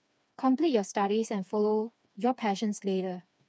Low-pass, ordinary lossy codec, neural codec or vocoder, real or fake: none; none; codec, 16 kHz, 4 kbps, FreqCodec, smaller model; fake